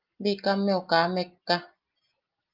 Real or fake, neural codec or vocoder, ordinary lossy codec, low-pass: real; none; Opus, 24 kbps; 5.4 kHz